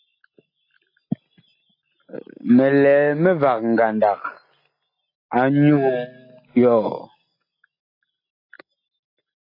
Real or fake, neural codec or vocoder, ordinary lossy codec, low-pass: real; none; AAC, 32 kbps; 5.4 kHz